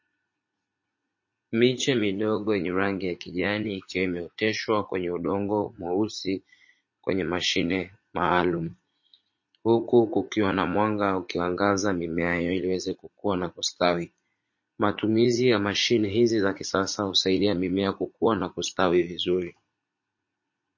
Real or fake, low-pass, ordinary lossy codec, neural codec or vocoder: fake; 7.2 kHz; MP3, 32 kbps; vocoder, 44.1 kHz, 80 mel bands, Vocos